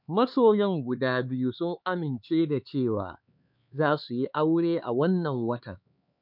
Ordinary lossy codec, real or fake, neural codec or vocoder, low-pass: none; fake; codec, 16 kHz, 4 kbps, X-Codec, HuBERT features, trained on LibriSpeech; 5.4 kHz